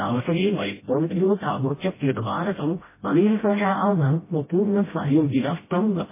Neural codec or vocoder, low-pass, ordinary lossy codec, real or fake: codec, 16 kHz, 0.5 kbps, FreqCodec, smaller model; 3.6 kHz; MP3, 16 kbps; fake